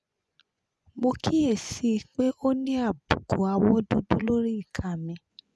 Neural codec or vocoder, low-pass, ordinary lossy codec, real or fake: vocoder, 24 kHz, 100 mel bands, Vocos; none; none; fake